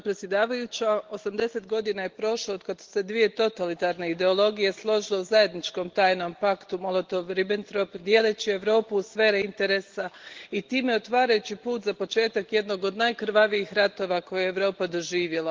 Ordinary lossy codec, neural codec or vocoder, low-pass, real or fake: Opus, 16 kbps; none; 7.2 kHz; real